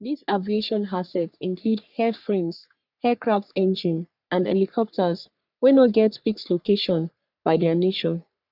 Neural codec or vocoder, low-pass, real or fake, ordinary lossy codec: codec, 44.1 kHz, 3.4 kbps, Pupu-Codec; 5.4 kHz; fake; none